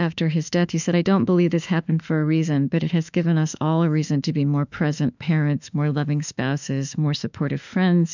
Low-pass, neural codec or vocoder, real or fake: 7.2 kHz; autoencoder, 48 kHz, 32 numbers a frame, DAC-VAE, trained on Japanese speech; fake